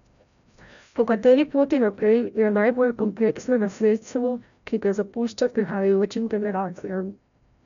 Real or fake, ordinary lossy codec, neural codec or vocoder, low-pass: fake; none; codec, 16 kHz, 0.5 kbps, FreqCodec, larger model; 7.2 kHz